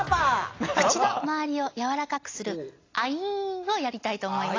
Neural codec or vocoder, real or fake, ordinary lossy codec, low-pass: none; real; AAC, 32 kbps; 7.2 kHz